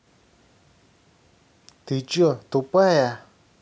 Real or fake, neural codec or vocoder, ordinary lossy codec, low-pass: real; none; none; none